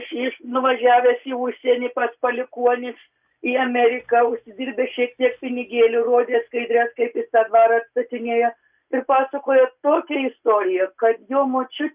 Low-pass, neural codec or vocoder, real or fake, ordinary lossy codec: 3.6 kHz; none; real; Opus, 64 kbps